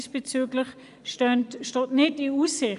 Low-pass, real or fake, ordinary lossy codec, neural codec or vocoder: 10.8 kHz; fake; none; vocoder, 24 kHz, 100 mel bands, Vocos